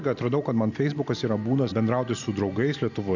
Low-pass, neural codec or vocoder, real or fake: 7.2 kHz; none; real